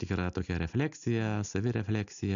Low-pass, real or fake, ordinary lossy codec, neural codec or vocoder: 7.2 kHz; real; MP3, 96 kbps; none